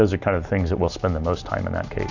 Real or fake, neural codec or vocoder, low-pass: real; none; 7.2 kHz